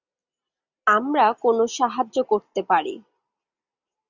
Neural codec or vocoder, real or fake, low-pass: none; real; 7.2 kHz